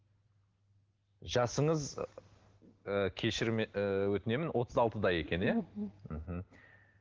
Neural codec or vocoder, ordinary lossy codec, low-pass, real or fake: none; Opus, 16 kbps; 7.2 kHz; real